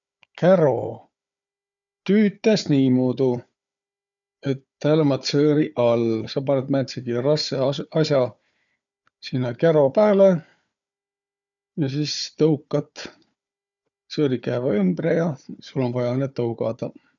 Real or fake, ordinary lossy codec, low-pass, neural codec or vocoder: fake; none; 7.2 kHz; codec, 16 kHz, 4 kbps, FunCodec, trained on Chinese and English, 50 frames a second